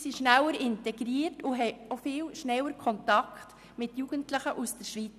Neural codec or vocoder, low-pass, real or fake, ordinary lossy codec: none; 14.4 kHz; real; none